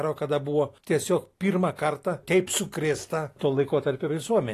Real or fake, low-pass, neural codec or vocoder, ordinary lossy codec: real; 14.4 kHz; none; AAC, 48 kbps